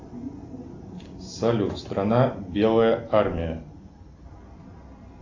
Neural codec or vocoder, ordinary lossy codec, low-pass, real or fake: none; AAC, 48 kbps; 7.2 kHz; real